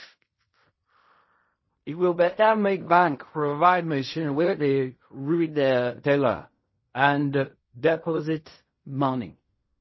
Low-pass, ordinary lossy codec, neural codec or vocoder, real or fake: 7.2 kHz; MP3, 24 kbps; codec, 16 kHz in and 24 kHz out, 0.4 kbps, LongCat-Audio-Codec, fine tuned four codebook decoder; fake